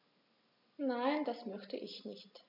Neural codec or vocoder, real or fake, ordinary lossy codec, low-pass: none; real; none; 5.4 kHz